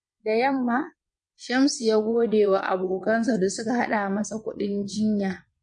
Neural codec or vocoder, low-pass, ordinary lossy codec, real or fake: vocoder, 22.05 kHz, 80 mel bands, WaveNeXt; 9.9 kHz; MP3, 48 kbps; fake